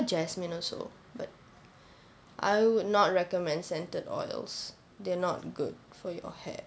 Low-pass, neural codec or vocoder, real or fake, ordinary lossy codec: none; none; real; none